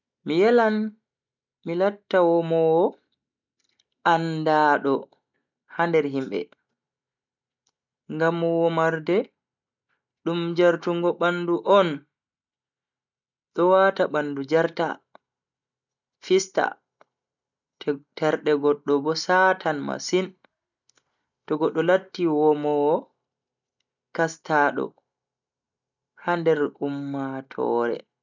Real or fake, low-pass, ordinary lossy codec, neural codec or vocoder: real; 7.2 kHz; none; none